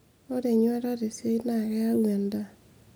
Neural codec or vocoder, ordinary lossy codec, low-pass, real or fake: none; none; none; real